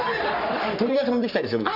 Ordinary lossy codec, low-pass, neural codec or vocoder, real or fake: none; 5.4 kHz; codec, 44.1 kHz, 3.4 kbps, Pupu-Codec; fake